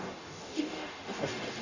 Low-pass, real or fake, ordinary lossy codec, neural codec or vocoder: 7.2 kHz; fake; none; codec, 44.1 kHz, 0.9 kbps, DAC